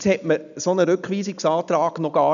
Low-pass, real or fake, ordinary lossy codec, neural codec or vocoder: 7.2 kHz; real; none; none